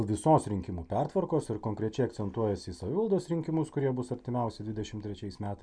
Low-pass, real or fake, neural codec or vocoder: 9.9 kHz; real; none